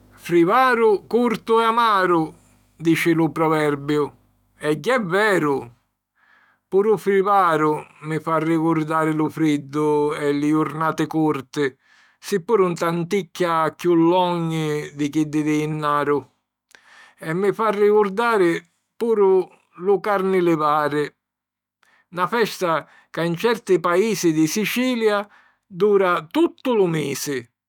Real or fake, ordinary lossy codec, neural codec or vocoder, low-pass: fake; none; autoencoder, 48 kHz, 128 numbers a frame, DAC-VAE, trained on Japanese speech; 19.8 kHz